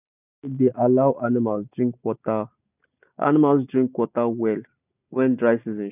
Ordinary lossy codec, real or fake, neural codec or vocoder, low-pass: none; real; none; 3.6 kHz